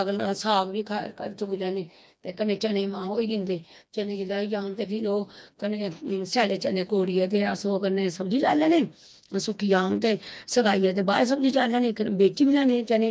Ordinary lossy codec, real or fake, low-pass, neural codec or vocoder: none; fake; none; codec, 16 kHz, 2 kbps, FreqCodec, smaller model